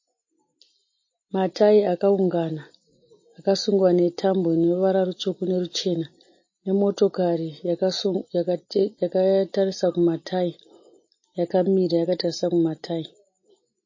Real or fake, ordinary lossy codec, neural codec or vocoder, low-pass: real; MP3, 32 kbps; none; 7.2 kHz